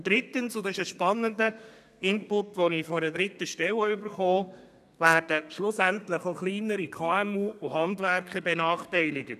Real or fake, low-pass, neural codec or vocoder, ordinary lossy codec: fake; 14.4 kHz; codec, 32 kHz, 1.9 kbps, SNAC; none